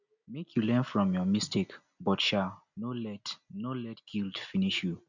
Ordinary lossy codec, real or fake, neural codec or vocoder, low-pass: none; real; none; 7.2 kHz